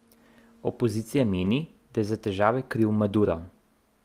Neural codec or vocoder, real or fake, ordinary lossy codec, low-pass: none; real; Opus, 32 kbps; 14.4 kHz